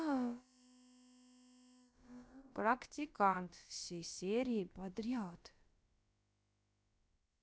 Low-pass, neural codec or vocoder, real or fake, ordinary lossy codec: none; codec, 16 kHz, about 1 kbps, DyCAST, with the encoder's durations; fake; none